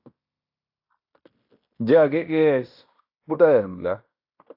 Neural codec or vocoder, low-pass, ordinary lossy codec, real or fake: codec, 16 kHz in and 24 kHz out, 0.9 kbps, LongCat-Audio-Codec, fine tuned four codebook decoder; 5.4 kHz; AAC, 48 kbps; fake